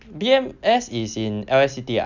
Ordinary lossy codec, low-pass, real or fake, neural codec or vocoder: none; 7.2 kHz; real; none